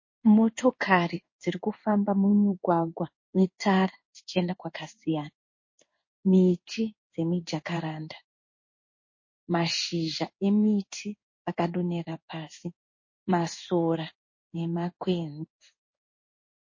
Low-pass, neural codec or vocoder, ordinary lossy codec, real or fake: 7.2 kHz; codec, 16 kHz in and 24 kHz out, 1 kbps, XY-Tokenizer; MP3, 32 kbps; fake